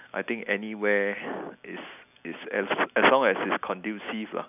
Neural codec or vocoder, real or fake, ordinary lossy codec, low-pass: none; real; none; 3.6 kHz